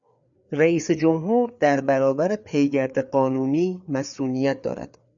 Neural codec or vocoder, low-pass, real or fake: codec, 16 kHz, 4 kbps, FreqCodec, larger model; 7.2 kHz; fake